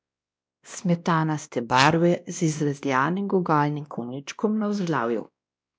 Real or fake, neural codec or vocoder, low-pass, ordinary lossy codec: fake; codec, 16 kHz, 1 kbps, X-Codec, WavLM features, trained on Multilingual LibriSpeech; none; none